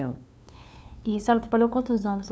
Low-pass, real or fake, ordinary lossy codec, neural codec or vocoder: none; fake; none; codec, 16 kHz, 2 kbps, FunCodec, trained on LibriTTS, 25 frames a second